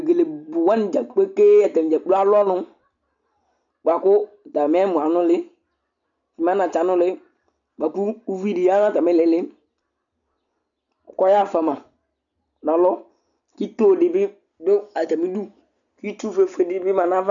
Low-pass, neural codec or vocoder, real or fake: 7.2 kHz; none; real